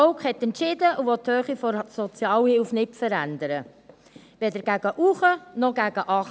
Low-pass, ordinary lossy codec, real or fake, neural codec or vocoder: none; none; real; none